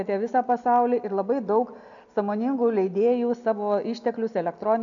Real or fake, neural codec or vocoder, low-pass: real; none; 7.2 kHz